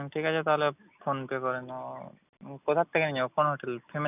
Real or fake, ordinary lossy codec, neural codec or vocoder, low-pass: real; none; none; 3.6 kHz